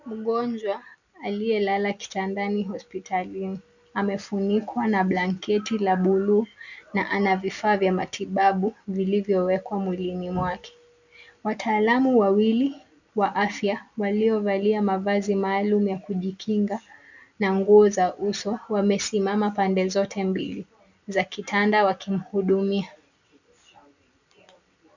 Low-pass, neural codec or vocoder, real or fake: 7.2 kHz; none; real